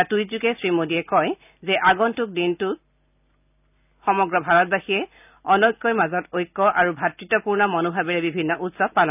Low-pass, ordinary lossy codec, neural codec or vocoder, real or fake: 3.6 kHz; none; none; real